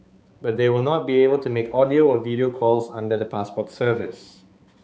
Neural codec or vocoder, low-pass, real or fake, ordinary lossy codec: codec, 16 kHz, 4 kbps, X-Codec, HuBERT features, trained on balanced general audio; none; fake; none